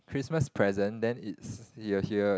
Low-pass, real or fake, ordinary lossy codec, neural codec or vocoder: none; real; none; none